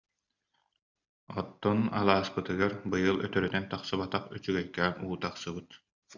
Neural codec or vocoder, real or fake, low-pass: none; real; 7.2 kHz